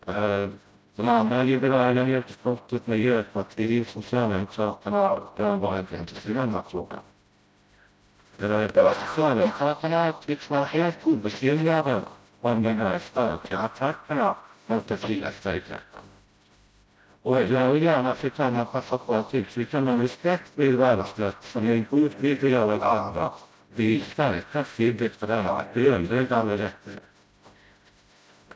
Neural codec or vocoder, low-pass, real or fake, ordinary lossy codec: codec, 16 kHz, 0.5 kbps, FreqCodec, smaller model; none; fake; none